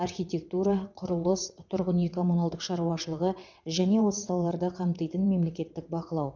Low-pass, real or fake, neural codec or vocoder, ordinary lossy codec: 7.2 kHz; fake; vocoder, 22.05 kHz, 80 mel bands, WaveNeXt; none